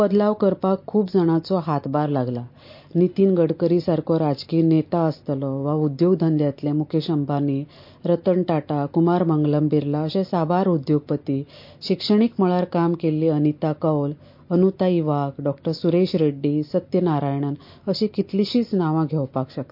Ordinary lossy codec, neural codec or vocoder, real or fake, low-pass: MP3, 32 kbps; none; real; 5.4 kHz